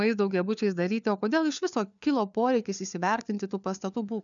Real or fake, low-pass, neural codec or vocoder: fake; 7.2 kHz; codec, 16 kHz, 4 kbps, FunCodec, trained on LibriTTS, 50 frames a second